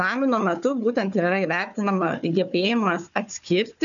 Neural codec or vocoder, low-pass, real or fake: codec, 16 kHz, 4 kbps, FunCodec, trained on Chinese and English, 50 frames a second; 7.2 kHz; fake